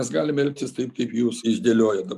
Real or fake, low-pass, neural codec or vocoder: real; 14.4 kHz; none